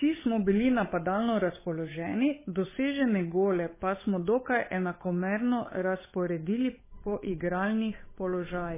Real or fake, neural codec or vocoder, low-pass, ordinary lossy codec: fake; codec, 16 kHz, 8 kbps, FunCodec, trained on Chinese and English, 25 frames a second; 3.6 kHz; MP3, 16 kbps